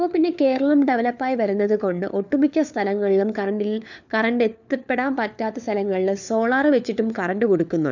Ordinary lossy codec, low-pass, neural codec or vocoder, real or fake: none; 7.2 kHz; codec, 16 kHz, 4 kbps, FunCodec, trained on LibriTTS, 50 frames a second; fake